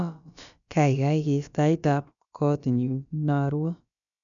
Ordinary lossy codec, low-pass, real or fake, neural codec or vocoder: none; 7.2 kHz; fake; codec, 16 kHz, about 1 kbps, DyCAST, with the encoder's durations